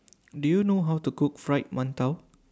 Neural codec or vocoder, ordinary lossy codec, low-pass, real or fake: none; none; none; real